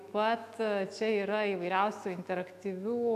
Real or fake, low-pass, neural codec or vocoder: fake; 14.4 kHz; autoencoder, 48 kHz, 128 numbers a frame, DAC-VAE, trained on Japanese speech